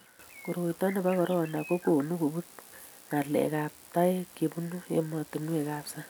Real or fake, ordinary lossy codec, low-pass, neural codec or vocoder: real; none; none; none